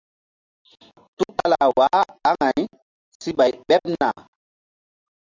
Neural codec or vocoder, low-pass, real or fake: none; 7.2 kHz; real